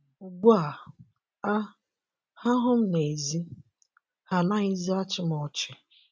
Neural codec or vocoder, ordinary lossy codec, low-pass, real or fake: none; none; none; real